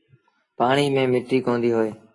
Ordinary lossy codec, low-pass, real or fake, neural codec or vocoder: AAC, 32 kbps; 10.8 kHz; real; none